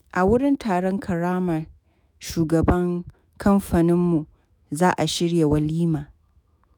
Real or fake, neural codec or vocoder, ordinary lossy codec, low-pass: fake; autoencoder, 48 kHz, 128 numbers a frame, DAC-VAE, trained on Japanese speech; none; none